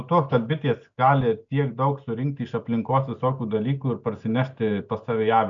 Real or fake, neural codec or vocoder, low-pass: real; none; 7.2 kHz